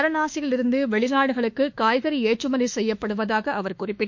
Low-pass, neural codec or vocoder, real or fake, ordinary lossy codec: 7.2 kHz; autoencoder, 48 kHz, 32 numbers a frame, DAC-VAE, trained on Japanese speech; fake; MP3, 48 kbps